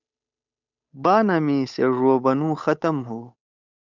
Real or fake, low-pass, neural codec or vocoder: fake; 7.2 kHz; codec, 16 kHz, 8 kbps, FunCodec, trained on Chinese and English, 25 frames a second